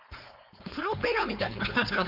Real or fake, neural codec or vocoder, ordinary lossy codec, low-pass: fake; codec, 16 kHz, 4.8 kbps, FACodec; none; 5.4 kHz